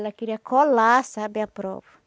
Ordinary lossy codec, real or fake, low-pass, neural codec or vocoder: none; real; none; none